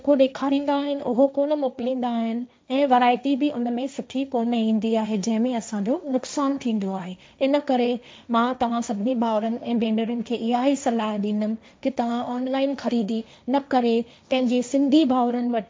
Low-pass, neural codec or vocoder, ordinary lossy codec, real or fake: none; codec, 16 kHz, 1.1 kbps, Voila-Tokenizer; none; fake